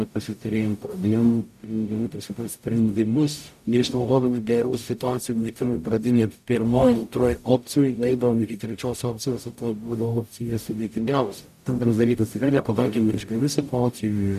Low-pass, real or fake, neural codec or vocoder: 14.4 kHz; fake; codec, 44.1 kHz, 0.9 kbps, DAC